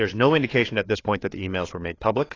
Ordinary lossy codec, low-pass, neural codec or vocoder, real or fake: AAC, 32 kbps; 7.2 kHz; codec, 16 kHz, 4 kbps, FreqCodec, larger model; fake